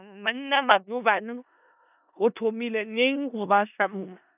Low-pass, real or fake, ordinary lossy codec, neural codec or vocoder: 3.6 kHz; fake; none; codec, 16 kHz in and 24 kHz out, 0.4 kbps, LongCat-Audio-Codec, four codebook decoder